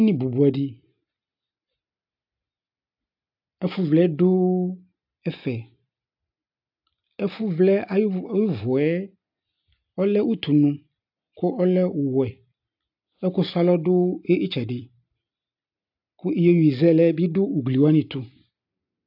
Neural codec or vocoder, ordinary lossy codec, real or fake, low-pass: none; AAC, 48 kbps; real; 5.4 kHz